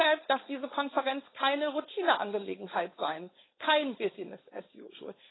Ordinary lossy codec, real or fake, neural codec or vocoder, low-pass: AAC, 16 kbps; fake; codec, 16 kHz, 4.8 kbps, FACodec; 7.2 kHz